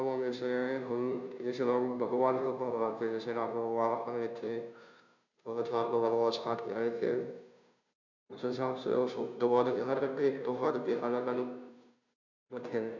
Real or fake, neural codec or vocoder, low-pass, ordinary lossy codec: fake; codec, 16 kHz, 0.5 kbps, FunCodec, trained on Chinese and English, 25 frames a second; 7.2 kHz; none